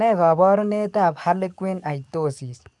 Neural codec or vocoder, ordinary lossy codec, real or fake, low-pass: codec, 44.1 kHz, 7.8 kbps, DAC; none; fake; 10.8 kHz